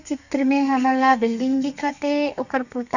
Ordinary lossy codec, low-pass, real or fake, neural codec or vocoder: none; 7.2 kHz; fake; codec, 32 kHz, 1.9 kbps, SNAC